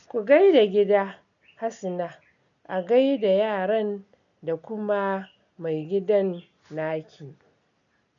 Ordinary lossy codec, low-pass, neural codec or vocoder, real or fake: none; 7.2 kHz; none; real